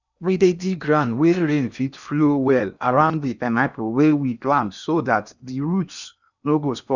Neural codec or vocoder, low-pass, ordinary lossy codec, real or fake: codec, 16 kHz in and 24 kHz out, 0.8 kbps, FocalCodec, streaming, 65536 codes; 7.2 kHz; none; fake